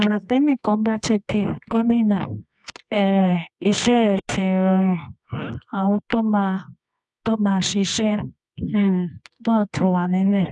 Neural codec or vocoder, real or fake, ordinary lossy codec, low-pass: codec, 24 kHz, 0.9 kbps, WavTokenizer, medium music audio release; fake; none; none